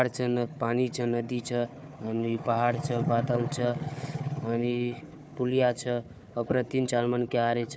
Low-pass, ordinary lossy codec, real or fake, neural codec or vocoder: none; none; fake; codec, 16 kHz, 4 kbps, FunCodec, trained on Chinese and English, 50 frames a second